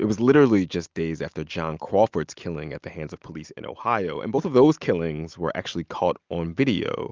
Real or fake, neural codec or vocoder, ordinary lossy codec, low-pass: real; none; Opus, 32 kbps; 7.2 kHz